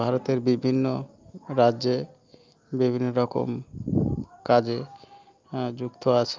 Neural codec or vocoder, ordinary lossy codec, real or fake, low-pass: none; Opus, 24 kbps; real; 7.2 kHz